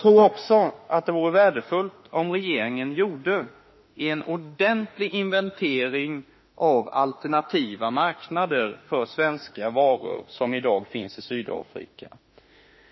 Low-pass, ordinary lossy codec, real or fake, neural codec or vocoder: 7.2 kHz; MP3, 24 kbps; fake; autoencoder, 48 kHz, 32 numbers a frame, DAC-VAE, trained on Japanese speech